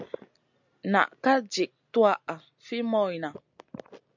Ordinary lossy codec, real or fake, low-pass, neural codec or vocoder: MP3, 64 kbps; real; 7.2 kHz; none